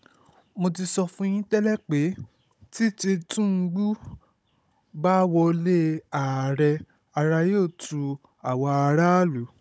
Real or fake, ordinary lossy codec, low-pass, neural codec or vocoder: fake; none; none; codec, 16 kHz, 16 kbps, FunCodec, trained on Chinese and English, 50 frames a second